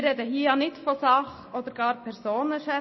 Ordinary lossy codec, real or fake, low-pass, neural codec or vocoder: MP3, 24 kbps; real; 7.2 kHz; none